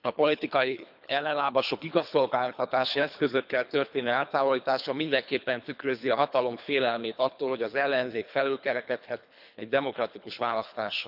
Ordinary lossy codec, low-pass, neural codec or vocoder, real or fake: none; 5.4 kHz; codec, 24 kHz, 3 kbps, HILCodec; fake